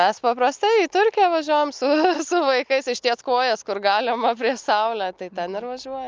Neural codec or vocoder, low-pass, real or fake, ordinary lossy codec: none; 7.2 kHz; real; Opus, 24 kbps